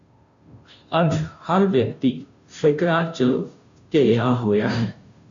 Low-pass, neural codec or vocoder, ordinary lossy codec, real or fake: 7.2 kHz; codec, 16 kHz, 0.5 kbps, FunCodec, trained on Chinese and English, 25 frames a second; MP3, 64 kbps; fake